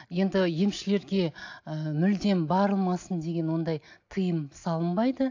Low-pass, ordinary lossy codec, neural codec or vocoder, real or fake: 7.2 kHz; AAC, 48 kbps; none; real